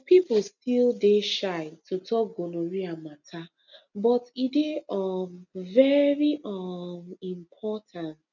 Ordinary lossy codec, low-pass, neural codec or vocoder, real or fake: none; 7.2 kHz; none; real